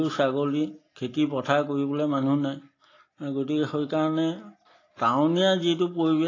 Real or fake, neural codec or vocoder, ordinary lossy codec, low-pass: real; none; AAC, 32 kbps; 7.2 kHz